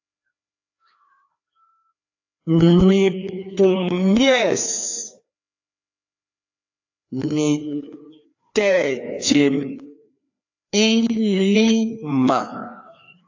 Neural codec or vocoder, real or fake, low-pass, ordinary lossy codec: codec, 16 kHz, 2 kbps, FreqCodec, larger model; fake; 7.2 kHz; AAC, 48 kbps